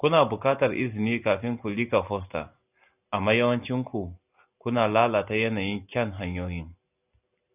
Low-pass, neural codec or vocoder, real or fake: 3.6 kHz; none; real